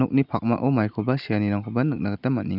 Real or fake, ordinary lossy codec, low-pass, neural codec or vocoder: real; none; 5.4 kHz; none